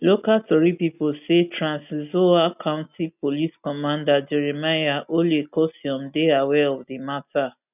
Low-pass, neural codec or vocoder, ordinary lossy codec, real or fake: 3.6 kHz; none; none; real